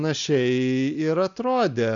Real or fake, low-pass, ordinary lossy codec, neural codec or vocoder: real; 7.2 kHz; MP3, 96 kbps; none